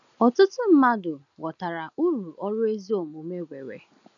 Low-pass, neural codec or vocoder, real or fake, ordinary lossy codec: 7.2 kHz; none; real; none